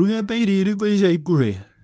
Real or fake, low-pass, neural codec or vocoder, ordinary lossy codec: fake; 10.8 kHz; codec, 24 kHz, 0.9 kbps, WavTokenizer, medium speech release version 1; none